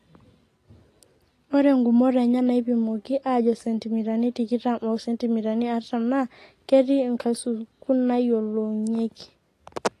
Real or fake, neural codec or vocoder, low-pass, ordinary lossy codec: real; none; 14.4 kHz; AAC, 48 kbps